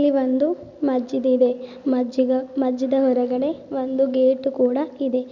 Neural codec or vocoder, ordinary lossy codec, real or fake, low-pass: none; none; real; 7.2 kHz